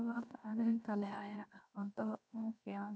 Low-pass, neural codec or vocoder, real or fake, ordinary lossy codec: none; codec, 16 kHz, 0.7 kbps, FocalCodec; fake; none